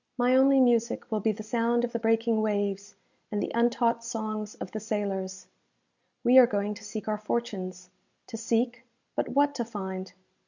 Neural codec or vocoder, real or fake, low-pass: none; real; 7.2 kHz